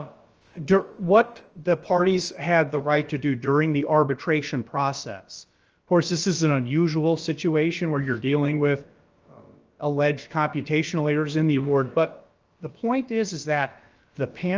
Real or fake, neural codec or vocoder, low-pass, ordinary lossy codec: fake; codec, 16 kHz, about 1 kbps, DyCAST, with the encoder's durations; 7.2 kHz; Opus, 24 kbps